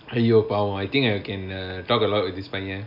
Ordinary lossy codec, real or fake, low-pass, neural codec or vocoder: none; real; 5.4 kHz; none